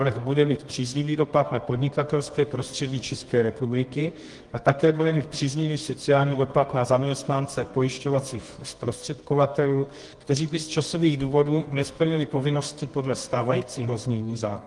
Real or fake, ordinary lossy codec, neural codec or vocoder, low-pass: fake; Opus, 24 kbps; codec, 24 kHz, 0.9 kbps, WavTokenizer, medium music audio release; 10.8 kHz